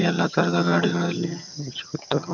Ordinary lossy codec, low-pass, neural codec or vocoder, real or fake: none; 7.2 kHz; vocoder, 22.05 kHz, 80 mel bands, HiFi-GAN; fake